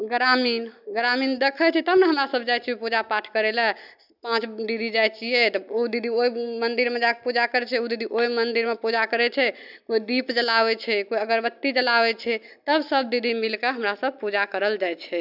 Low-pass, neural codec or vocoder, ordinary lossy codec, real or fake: 5.4 kHz; none; none; real